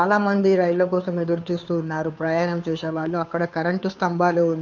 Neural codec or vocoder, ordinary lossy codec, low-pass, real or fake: codec, 16 kHz, 2 kbps, FunCodec, trained on Chinese and English, 25 frames a second; none; 7.2 kHz; fake